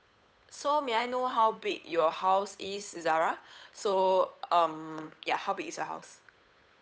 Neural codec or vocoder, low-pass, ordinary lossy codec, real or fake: codec, 16 kHz, 8 kbps, FunCodec, trained on Chinese and English, 25 frames a second; none; none; fake